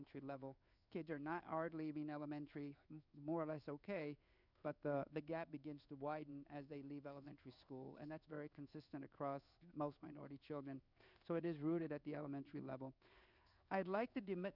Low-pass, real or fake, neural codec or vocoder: 5.4 kHz; fake; codec, 16 kHz in and 24 kHz out, 1 kbps, XY-Tokenizer